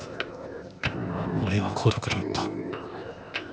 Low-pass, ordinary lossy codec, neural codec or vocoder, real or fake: none; none; codec, 16 kHz, 0.8 kbps, ZipCodec; fake